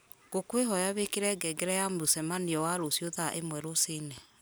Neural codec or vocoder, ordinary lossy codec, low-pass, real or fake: none; none; none; real